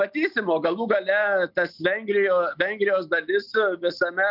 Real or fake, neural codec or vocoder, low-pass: real; none; 5.4 kHz